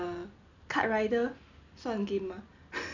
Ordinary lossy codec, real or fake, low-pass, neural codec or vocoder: none; real; 7.2 kHz; none